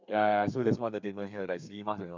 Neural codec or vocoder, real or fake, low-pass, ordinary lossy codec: codec, 32 kHz, 1.9 kbps, SNAC; fake; 7.2 kHz; MP3, 64 kbps